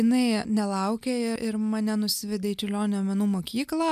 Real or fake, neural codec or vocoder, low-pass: real; none; 14.4 kHz